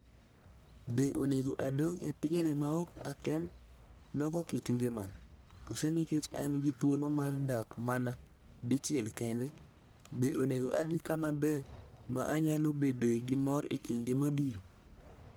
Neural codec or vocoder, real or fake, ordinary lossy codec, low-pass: codec, 44.1 kHz, 1.7 kbps, Pupu-Codec; fake; none; none